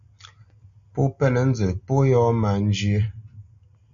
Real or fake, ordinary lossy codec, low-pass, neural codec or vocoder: real; MP3, 96 kbps; 7.2 kHz; none